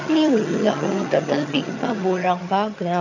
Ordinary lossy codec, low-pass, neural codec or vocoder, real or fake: none; 7.2 kHz; vocoder, 22.05 kHz, 80 mel bands, HiFi-GAN; fake